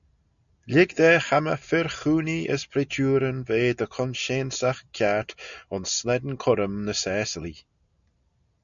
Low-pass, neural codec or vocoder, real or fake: 7.2 kHz; none; real